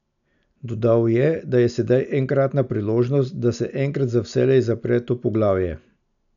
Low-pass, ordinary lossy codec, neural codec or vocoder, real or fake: 7.2 kHz; none; none; real